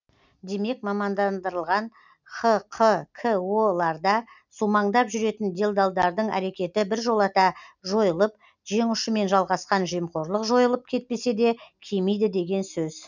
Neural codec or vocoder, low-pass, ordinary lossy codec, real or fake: none; 7.2 kHz; none; real